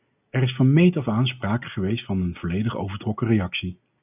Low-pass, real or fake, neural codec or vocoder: 3.6 kHz; real; none